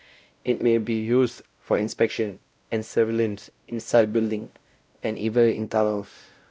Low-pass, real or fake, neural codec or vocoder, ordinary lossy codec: none; fake; codec, 16 kHz, 0.5 kbps, X-Codec, WavLM features, trained on Multilingual LibriSpeech; none